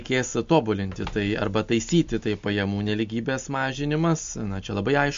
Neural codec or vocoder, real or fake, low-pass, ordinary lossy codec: none; real; 7.2 kHz; MP3, 48 kbps